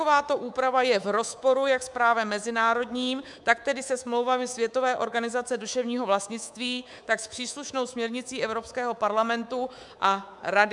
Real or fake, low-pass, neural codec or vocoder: fake; 10.8 kHz; codec, 24 kHz, 3.1 kbps, DualCodec